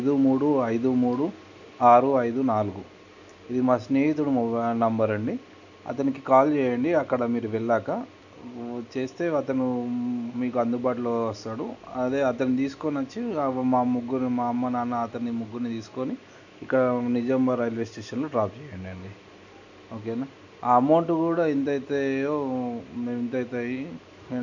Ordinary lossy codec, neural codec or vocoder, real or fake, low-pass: none; none; real; 7.2 kHz